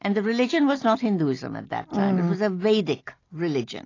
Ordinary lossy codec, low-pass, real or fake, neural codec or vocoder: AAC, 32 kbps; 7.2 kHz; real; none